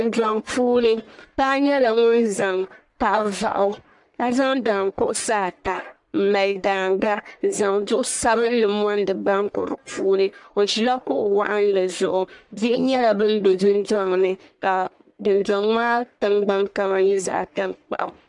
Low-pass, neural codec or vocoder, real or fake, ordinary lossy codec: 10.8 kHz; codec, 44.1 kHz, 1.7 kbps, Pupu-Codec; fake; MP3, 96 kbps